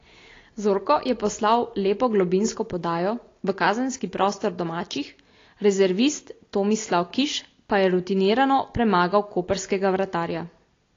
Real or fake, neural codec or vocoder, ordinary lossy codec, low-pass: real; none; AAC, 32 kbps; 7.2 kHz